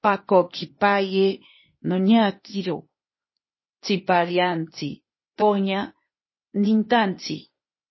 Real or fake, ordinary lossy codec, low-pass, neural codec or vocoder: fake; MP3, 24 kbps; 7.2 kHz; codec, 16 kHz, 0.8 kbps, ZipCodec